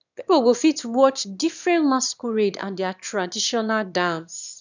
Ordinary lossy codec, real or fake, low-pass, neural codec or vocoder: none; fake; 7.2 kHz; autoencoder, 22.05 kHz, a latent of 192 numbers a frame, VITS, trained on one speaker